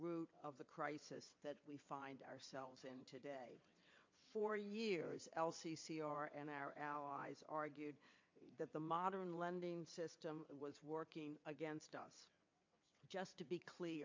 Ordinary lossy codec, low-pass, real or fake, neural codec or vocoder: MP3, 48 kbps; 7.2 kHz; fake; vocoder, 22.05 kHz, 80 mel bands, WaveNeXt